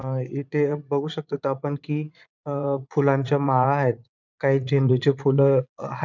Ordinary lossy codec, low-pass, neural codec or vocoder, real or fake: none; 7.2 kHz; vocoder, 22.05 kHz, 80 mel bands, Vocos; fake